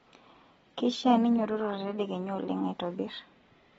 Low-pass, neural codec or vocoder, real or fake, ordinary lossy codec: 19.8 kHz; none; real; AAC, 24 kbps